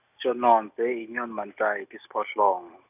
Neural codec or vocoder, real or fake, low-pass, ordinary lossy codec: none; real; 3.6 kHz; none